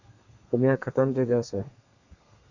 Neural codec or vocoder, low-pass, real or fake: codec, 32 kHz, 1.9 kbps, SNAC; 7.2 kHz; fake